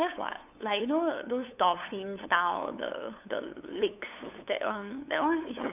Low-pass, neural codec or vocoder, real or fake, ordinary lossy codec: 3.6 kHz; codec, 16 kHz, 8 kbps, FunCodec, trained on LibriTTS, 25 frames a second; fake; none